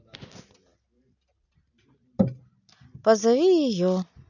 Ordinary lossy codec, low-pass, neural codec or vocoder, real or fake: none; 7.2 kHz; none; real